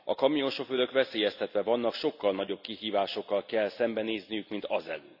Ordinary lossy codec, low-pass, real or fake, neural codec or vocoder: none; 5.4 kHz; real; none